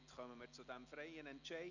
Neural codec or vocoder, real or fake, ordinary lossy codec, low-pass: none; real; none; 7.2 kHz